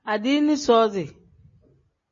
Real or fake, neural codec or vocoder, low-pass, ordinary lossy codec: real; none; 7.2 kHz; MP3, 32 kbps